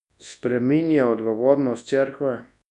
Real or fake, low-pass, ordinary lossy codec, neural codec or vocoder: fake; 10.8 kHz; none; codec, 24 kHz, 0.9 kbps, WavTokenizer, large speech release